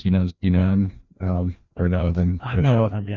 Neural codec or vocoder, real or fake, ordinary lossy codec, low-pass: codec, 16 kHz, 1 kbps, FreqCodec, larger model; fake; Opus, 64 kbps; 7.2 kHz